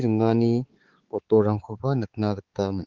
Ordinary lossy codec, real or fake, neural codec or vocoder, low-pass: Opus, 16 kbps; fake; codec, 16 kHz, 4 kbps, X-Codec, HuBERT features, trained on balanced general audio; 7.2 kHz